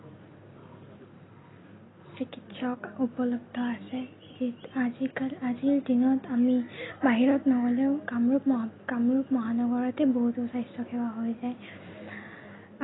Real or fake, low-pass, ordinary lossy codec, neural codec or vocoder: real; 7.2 kHz; AAC, 16 kbps; none